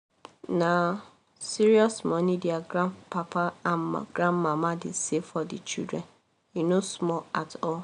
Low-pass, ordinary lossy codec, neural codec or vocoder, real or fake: 9.9 kHz; none; none; real